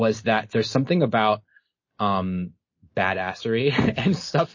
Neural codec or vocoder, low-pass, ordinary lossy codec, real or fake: none; 7.2 kHz; MP3, 32 kbps; real